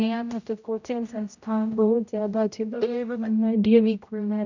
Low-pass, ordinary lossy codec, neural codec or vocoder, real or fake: 7.2 kHz; none; codec, 16 kHz, 0.5 kbps, X-Codec, HuBERT features, trained on general audio; fake